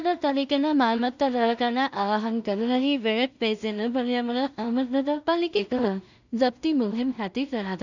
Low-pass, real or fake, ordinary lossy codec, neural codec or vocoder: 7.2 kHz; fake; none; codec, 16 kHz in and 24 kHz out, 0.4 kbps, LongCat-Audio-Codec, two codebook decoder